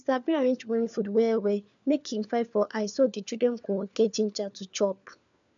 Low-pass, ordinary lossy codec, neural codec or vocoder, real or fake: 7.2 kHz; none; codec, 16 kHz, 4 kbps, FunCodec, trained on LibriTTS, 50 frames a second; fake